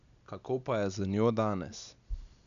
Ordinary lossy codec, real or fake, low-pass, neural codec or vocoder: none; real; 7.2 kHz; none